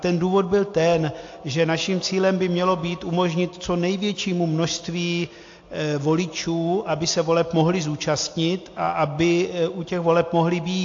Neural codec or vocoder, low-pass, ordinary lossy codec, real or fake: none; 7.2 kHz; AAC, 48 kbps; real